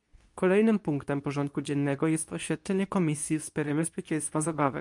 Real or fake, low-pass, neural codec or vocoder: fake; 10.8 kHz; codec, 24 kHz, 0.9 kbps, WavTokenizer, medium speech release version 2